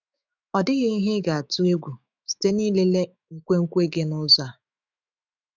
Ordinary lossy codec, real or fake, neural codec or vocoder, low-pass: none; fake; autoencoder, 48 kHz, 128 numbers a frame, DAC-VAE, trained on Japanese speech; 7.2 kHz